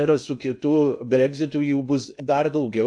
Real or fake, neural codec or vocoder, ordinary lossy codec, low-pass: fake; codec, 16 kHz in and 24 kHz out, 0.6 kbps, FocalCodec, streaming, 2048 codes; AAC, 64 kbps; 9.9 kHz